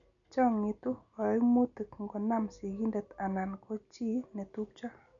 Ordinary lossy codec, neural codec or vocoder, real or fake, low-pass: none; none; real; 7.2 kHz